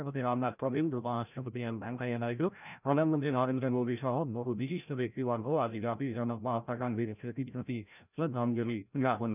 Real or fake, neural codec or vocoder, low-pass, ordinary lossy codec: fake; codec, 16 kHz, 0.5 kbps, FreqCodec, larger model; 3.6 kHz; none